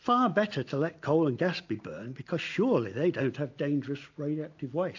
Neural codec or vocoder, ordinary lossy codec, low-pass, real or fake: none; AAC, 48 kbps; 7.2 kHz; real